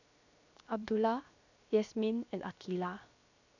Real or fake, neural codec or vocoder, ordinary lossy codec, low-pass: fake; codec, 16 kHz, 0.7 kbps, FocalCodec; none; 7.2 kHz